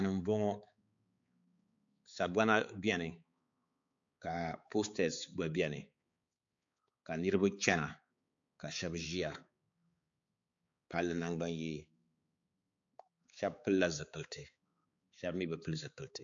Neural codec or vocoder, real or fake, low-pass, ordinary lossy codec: codec, 16 kHz, 4 kbps, X-Codec, HuBERT features, trained on balanced general audio; fake; 7.2 kHz; AAC, 64 kbps